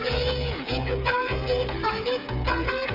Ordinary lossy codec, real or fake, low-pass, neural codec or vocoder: none; fake; 5.4 kHz; codec, 16 kHz, 8 kbps, FreqCodec, smaller model